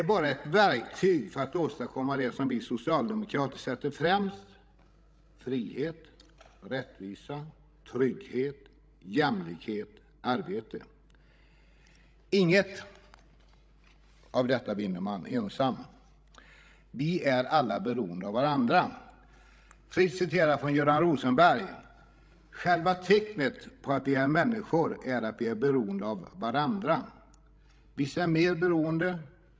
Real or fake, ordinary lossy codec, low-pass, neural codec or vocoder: fake; none; none; codec, 16 kHz, 16 kbps, FreqCodec, larger model